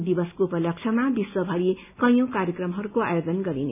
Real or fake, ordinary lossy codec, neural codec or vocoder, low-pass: real; none; none; 3.6 kHz